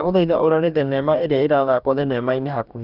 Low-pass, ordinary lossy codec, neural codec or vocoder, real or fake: 5.4 kHz; none; codec, 44.1 kHz, 2.6 kbps, DAC; fake